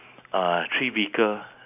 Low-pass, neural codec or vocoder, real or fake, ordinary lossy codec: 3.6 kHz; none; real; none